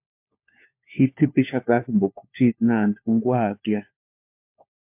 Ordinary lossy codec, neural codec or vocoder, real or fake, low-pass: MP3, 32 kbps; codec, 16 kHz, 4 kbps, FunCodec, trained on LibriTTS, 50 frames a second; fake; 3.6 kHz